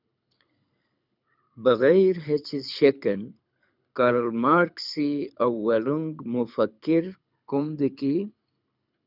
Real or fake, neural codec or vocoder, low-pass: fake; codec, 24 kHz, 6 kbps, HILCodec; 5.4 kHz